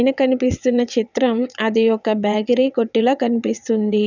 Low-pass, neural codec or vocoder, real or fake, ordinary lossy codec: 7.2 kHz; vocoder, 22.05 kHz, 80 mel bands, WaveNeXt; fake; none